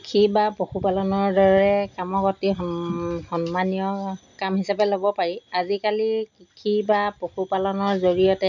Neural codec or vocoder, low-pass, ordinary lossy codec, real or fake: none; 7.2 kHz; none; real